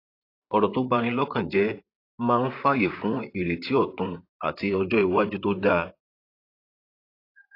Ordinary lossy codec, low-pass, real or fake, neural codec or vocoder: AAC, 32 kbps; 5.4 kHz; fake; vocoder, 44.1 kHz, 128 mel bands, Pupu-Vocoder